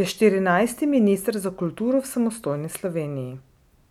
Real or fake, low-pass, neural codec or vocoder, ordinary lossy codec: real; 19.8 kHz; none; none